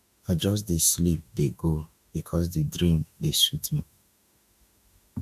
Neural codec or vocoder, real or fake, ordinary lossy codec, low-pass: autoencoder, 48 kHz, 32 numbers a frame, DAC-VAE, trained on Japanese speech; fake; none; 14.4 kHz